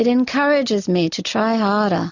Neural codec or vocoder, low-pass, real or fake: none; 7.2 kHz; real